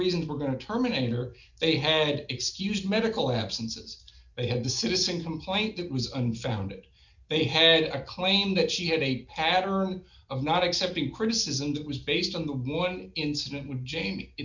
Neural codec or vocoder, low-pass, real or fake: none; 7.2 kHz; real